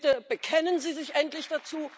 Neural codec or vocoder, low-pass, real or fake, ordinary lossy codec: none; none; real; none